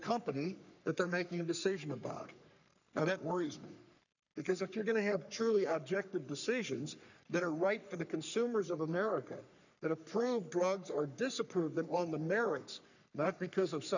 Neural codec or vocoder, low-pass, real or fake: codec, 44.1 kHz, 3.4 kbps, Pupu-Codec; 7.2 kHz; fake